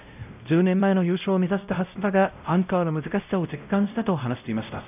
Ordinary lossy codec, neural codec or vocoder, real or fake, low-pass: none; codec, 16 kHz, 0.5 kbps, X-Codec, WavLM features, trained on Multilingual LibriSpeech; fake; 3.6 kHz